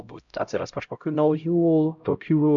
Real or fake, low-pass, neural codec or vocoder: fake; 7.2 kHz; codec, 16 kHz, 0.5 kbps, X-Codec, HuBERT features, trained on LibriSpeech